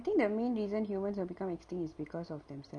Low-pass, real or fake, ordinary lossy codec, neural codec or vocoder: 9.9 kHz; real; none; none